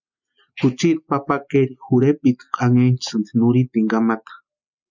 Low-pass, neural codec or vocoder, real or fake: 7.2 kHz; none; real